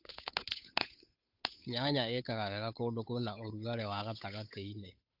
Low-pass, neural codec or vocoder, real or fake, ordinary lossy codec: 5.4 kHz; codec, 16 kHz, 8 kbps, FunCodec, trained on Chinese and English, 25 frames a second; fake; AAC, 48 kbps